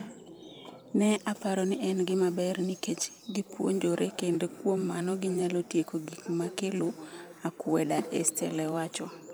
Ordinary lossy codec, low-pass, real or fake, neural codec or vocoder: none; none; fake; vocoder, 44.1 kHz, 128 mel bands every 512 samples, BigVGAN v2